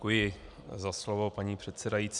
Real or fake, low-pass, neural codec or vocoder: real; 10.8 kHz; none